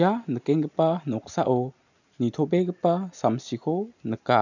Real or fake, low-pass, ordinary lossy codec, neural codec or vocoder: real; 7.2 kHz; none; none